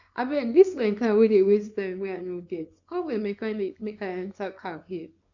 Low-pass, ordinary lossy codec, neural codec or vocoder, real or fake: 7.2 kHz; none; codec, 24 kHz, 0.9 kbps, WavTokenizer, small release; fake